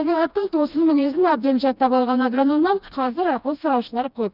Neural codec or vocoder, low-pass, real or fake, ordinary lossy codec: codec, 16 kHz, 1 kbps, FreqCodec, smaller model; 5.4 kHz; fake; none